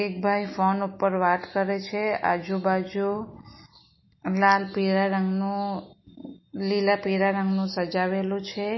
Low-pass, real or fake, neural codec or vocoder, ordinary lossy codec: 7.2 kHz; real; none; MP3, 24 kbps